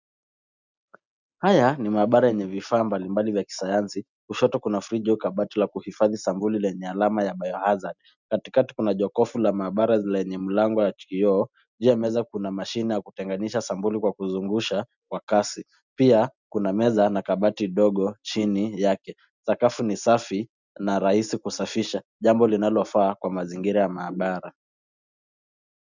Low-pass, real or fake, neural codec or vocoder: 7.2 kHz; real; none